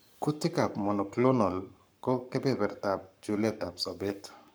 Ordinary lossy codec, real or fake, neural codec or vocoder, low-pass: none; fake; codec, 44.1 kHz, 7.8 kbps, Pupu-Codec; none